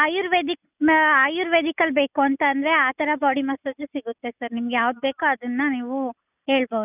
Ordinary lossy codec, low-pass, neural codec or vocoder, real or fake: none; 3.6 kHz; none; real